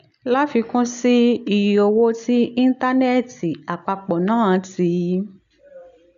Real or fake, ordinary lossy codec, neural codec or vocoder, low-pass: real; none; none; 7.2 kHz